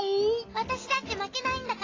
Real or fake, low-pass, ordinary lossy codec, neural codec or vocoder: real; 7.2 kHz; AAC, 32 kbps; none